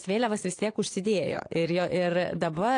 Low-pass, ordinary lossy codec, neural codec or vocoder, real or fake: 9.9 kHz; AAC, 48 kbps; vocoder, 22.05 kHz, 80 mel bands, Vocos; fake